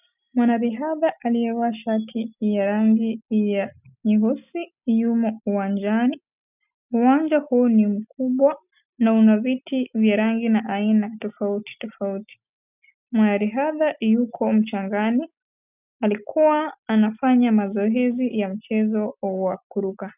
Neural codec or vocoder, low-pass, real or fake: none; 3.6 kHz; real